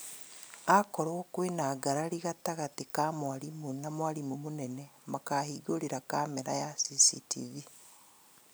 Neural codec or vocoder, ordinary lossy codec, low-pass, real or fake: none; none; none; real